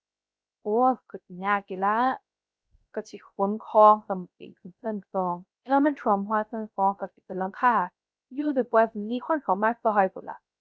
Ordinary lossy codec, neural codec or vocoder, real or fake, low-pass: none; codec, 16 kHz, 0.3 kbps, FocalCodec; fake; none